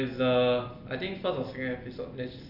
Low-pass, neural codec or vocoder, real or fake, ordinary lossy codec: 5.4 kHz; none; real; none